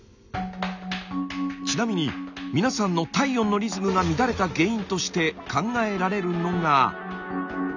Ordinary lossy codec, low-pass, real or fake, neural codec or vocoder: none; 7.2 kHz; real; none